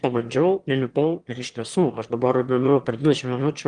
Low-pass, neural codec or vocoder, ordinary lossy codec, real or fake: 9.9 kHz; autoencoder, 22.05 kHz, a latent of 192 numbers a frame, VITS, trained on one speaker; Opus, 24 kbps; fake